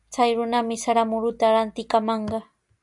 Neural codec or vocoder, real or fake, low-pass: none; real; 10.8 kHz